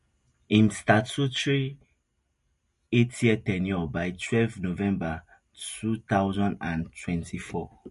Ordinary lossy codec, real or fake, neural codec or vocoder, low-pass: MP3, 48 kbps; real; none; 14.4 kHz